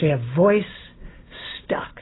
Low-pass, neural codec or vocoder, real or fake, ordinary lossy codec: 7.2 kHz; none; real; AAC, 16 kbps